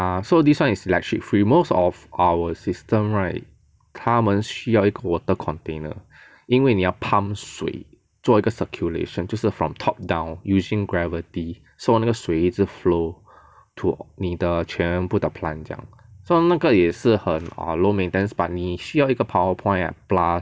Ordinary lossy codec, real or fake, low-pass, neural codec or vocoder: none; real; none; none